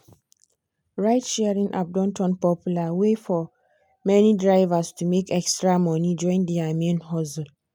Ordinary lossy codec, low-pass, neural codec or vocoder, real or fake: none; none; none; real